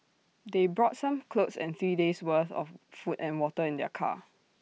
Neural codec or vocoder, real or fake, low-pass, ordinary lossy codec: none; real; none; none